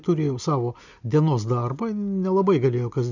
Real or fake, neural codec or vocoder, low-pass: real; none; 7.2 kHz